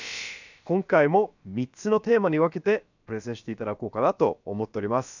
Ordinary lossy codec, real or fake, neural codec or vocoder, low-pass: none; fake; codec, 16 kHz, about 1 kbps, DyCAST, with the encoder's durations; 7.2 kHz